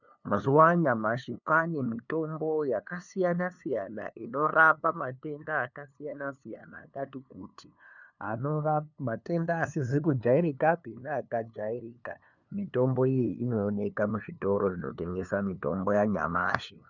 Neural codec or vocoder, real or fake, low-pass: codec, 16 kHz, 2 kbps, FunCodec, trained on LibriTTS, 25 frames a second; fake; 7.2 kHz